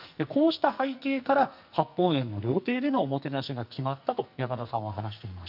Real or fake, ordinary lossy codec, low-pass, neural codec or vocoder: fake; none; 5.4 kHz; codec, 44.1 kHz, 2.6 kbps, SNAC